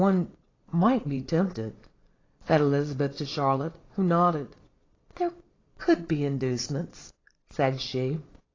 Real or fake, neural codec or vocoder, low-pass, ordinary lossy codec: fake; vocoder, 22.05 kHz, 80 mel bands, Vocos; 7.2 kHz; AAC, 32 kbps